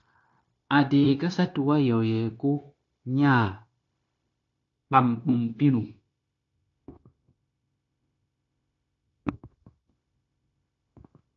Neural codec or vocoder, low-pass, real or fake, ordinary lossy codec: codec, 16 kHz, 0.9 kbps, LongCat-Audio-Codec; 7.2 kHz; fake; AAC, 48 kbps